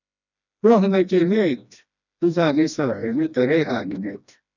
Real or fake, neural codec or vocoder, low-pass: fake; codec, 16 kHz, 1 kbps, FreqCodec, smaller model; 7.2 kHz